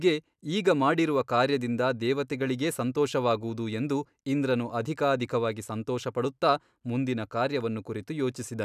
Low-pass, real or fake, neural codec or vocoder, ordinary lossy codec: 14.4 kHz; real; none; none